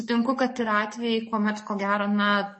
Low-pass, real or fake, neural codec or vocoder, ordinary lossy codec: 9.9 kHz; fake; codec, 44.1 kHz, 7.8 kbps, DAC; MP3, 32 kbps